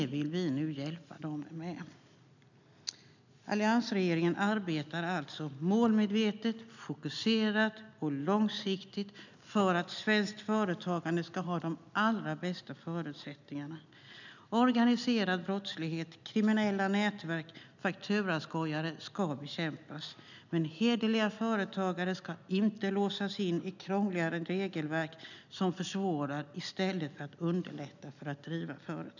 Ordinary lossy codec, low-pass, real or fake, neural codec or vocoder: none; 7.2 kHz; real; none